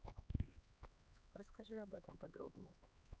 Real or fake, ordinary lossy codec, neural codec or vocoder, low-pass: fake; none; codec, 16 kHz, 2 kbps, X-Codec, HuBERT features, trained on LibriSpeech; none